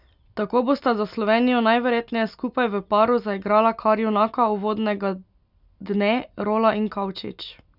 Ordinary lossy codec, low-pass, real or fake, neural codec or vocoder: none; 5.4 kHz; real; none